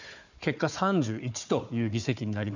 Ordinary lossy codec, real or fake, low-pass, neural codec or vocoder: none; fake; 7.2 kHz; codec, 16 kHz, 4 kbps, FunCodec, trained on Chinese and English, 50 frames a second